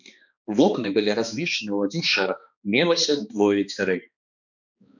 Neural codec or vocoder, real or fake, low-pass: codec, 16 kHz, 2 kbps, X-Codec, HuBERT features, trained on balanced general audio; fake; 7.2 kHz